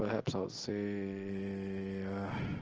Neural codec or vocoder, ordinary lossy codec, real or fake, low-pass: none; Opus, 16 kbps; real; 7.2 kHz